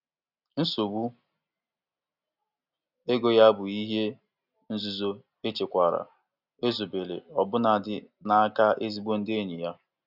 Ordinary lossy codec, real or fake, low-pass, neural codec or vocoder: none; real; 5.4 kHz; none